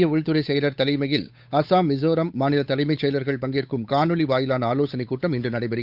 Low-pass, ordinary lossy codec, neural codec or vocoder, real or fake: 5.4 kHz; none; codec, 16 kHz, 8 kbps, FunCodec, trained on Chinese and English, 25 frames a second; fake